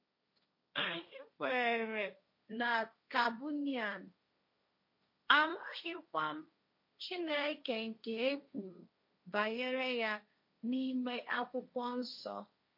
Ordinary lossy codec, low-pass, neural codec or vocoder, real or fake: MP3, 32 kbps; 5.4 kHz; codec, 16 kHz, 1.1 kbps, Voila-Tokenizer; fake